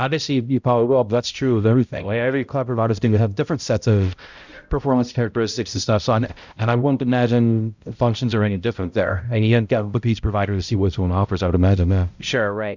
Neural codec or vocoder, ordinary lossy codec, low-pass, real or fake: codec, 16 kHz, 0.5 kbps, X-Codec, HuBERT features, trained on balanced general audio; Opus, 64 kbps; 7.2 kHz; fake